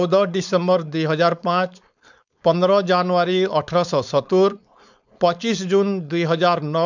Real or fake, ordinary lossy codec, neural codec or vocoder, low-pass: fake; none; codec, 16 kHz, 4.8 kbps, FACodec; 7.2 kHz